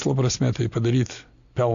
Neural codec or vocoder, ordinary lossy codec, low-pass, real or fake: none; Opus, 64 kbps; 7.2 kHz; real